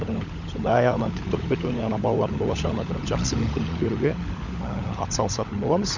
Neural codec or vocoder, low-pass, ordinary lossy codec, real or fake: codec, 16 kHz, 16 kbps, FunCodec, trained on LibriTTS, 50 frames a second; 7.2 kHz; none; fake